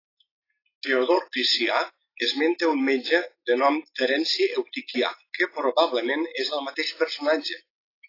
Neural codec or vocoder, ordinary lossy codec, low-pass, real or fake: none; AAC, 24 kbps; 5.4 kHz; real